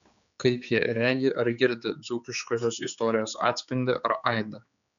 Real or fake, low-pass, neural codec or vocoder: fake; 7.2 kHz; codec, 16 kHz, 4 kbps, X-Codec, HuBERT features, trained on general audio